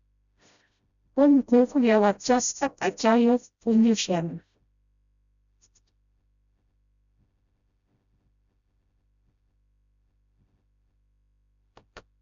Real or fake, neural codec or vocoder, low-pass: fake; codec, 16 kHz, 0.5 kbps, FreqCodec, smaller model; 7.2 kHz